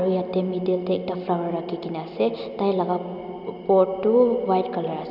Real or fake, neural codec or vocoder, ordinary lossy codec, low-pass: real; none; none; 5.4 kHz